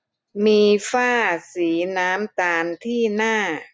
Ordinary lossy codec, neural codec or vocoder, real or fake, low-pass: none; none; real; none